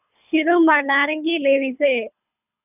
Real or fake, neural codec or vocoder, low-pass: fake; codec, 24 kHz, 3 kbps, HILCodec; 3.6 kHz